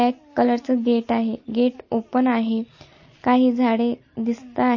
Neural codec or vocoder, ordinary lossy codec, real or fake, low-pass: none; MP3, 32 kbps; real; 7.2 kHz